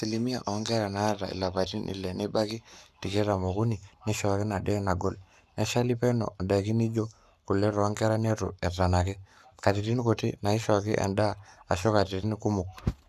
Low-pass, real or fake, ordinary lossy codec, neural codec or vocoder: 14.4 kHz; fake; none; codec, 44.1 kHz, 7.8 kbps, Pupu-Codec